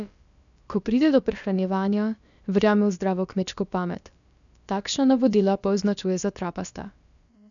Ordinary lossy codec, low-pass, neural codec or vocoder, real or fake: none; 7.2 kHz; codec, 16 kHz, about 1 kbps, DyCAST, with the encoder's durations; fake